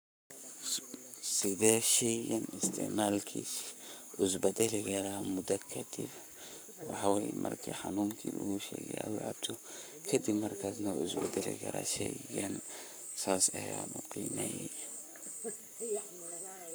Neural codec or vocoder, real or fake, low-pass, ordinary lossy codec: codec, 44.1 kHz, 7.8 kbps, Pupu-Codec; fake; none; none